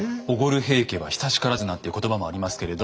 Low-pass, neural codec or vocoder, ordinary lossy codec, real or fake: none; none; none; real